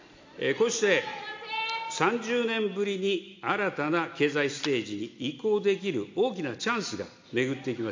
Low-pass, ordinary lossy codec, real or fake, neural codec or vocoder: 7.2 kHz; MP3, 48 kbps; real; none